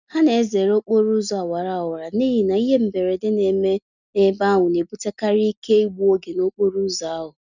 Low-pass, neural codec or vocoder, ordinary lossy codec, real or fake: 7.2 kHz; none; none; real